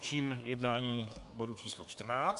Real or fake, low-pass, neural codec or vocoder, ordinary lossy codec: fake; 10.8 kHz; codec, 24 kHz, 1 kbps, SNAC; AAC, 64 kbps